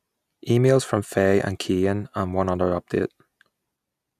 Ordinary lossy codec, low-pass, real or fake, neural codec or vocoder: none; 14.4 kHz; real; none